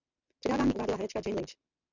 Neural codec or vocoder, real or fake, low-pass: none; real; 7.2 kHz